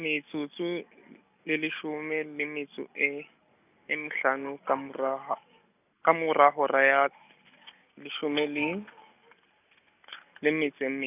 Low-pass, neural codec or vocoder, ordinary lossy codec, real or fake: 3.6 kHz; none; none; real